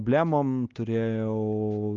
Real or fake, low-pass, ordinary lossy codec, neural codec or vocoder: real; 7.2 kHz; Opus, 32 kbps; none